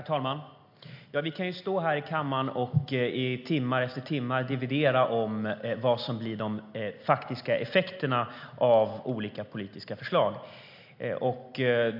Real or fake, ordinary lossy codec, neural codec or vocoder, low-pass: real; MP3, 48 kbps; none; 5.4 kHz